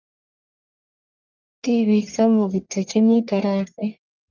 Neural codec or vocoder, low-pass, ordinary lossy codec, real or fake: codec, 44.1 kHz, 1.7 kbps, Pupu-Codec; 7.2 kHz; Opus, 24 kbps; fake